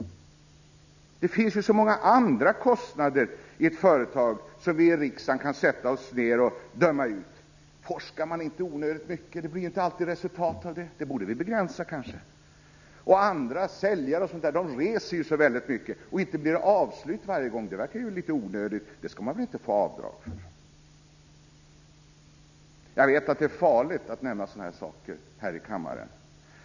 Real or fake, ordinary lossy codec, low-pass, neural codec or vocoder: real; none; 7.2 kHz; none